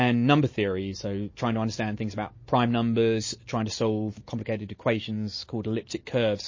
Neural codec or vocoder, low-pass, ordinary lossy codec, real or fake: none; 7.2 kHz; MP3, 32 kbps; real